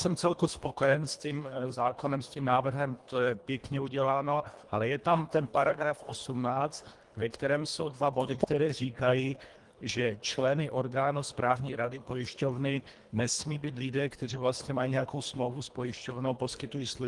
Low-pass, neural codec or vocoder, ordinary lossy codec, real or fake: 10.8 kHz; codec, 24 kHz, 1.5 kbps, HILCodec; Opus, 24 kbps; fake